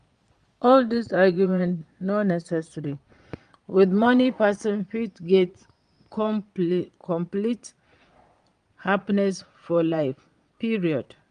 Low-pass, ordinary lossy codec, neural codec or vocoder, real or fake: 9.9 kHz; Opus, 32 kbps; vocoder, 22.05 kHz, 80 mel bands, Vocos; fake